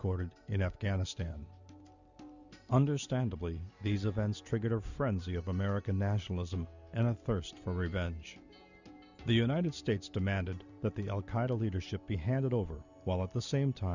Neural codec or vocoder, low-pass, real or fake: none; 7.2 kHz; real